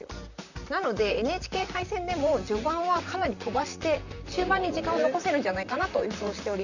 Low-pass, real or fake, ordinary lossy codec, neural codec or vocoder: 7.2 kHz; fake; none; vocoder, 44.1 kHz, 128 mel bands, Pupu-Vocoder